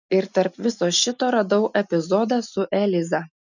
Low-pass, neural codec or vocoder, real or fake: 7.2 kHz; none; real